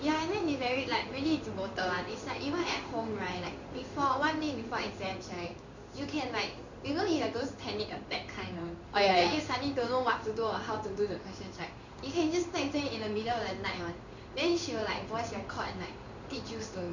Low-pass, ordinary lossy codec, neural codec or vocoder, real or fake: 7.2 kHz; none; codec, 16 kHz in and 24 kHz out, 1 kbps, XY-Tokenizer; fake